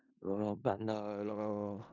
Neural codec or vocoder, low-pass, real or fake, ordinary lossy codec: codec, 16 kHz in and 24 kHz out, 0.4 kbps, LongCat-Audio-Codec, four codebook decoder; 7.2 kHz; fake; none